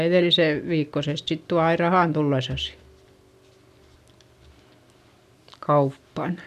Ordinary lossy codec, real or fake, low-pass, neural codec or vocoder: none; real; 14.4 kHz; none